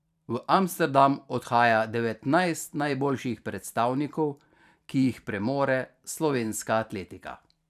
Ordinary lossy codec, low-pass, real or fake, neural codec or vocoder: none; 14.4 kHz; fake; vocoder, 44.1 kHz, 128 mel bands every 512 samples, BigVGAN v2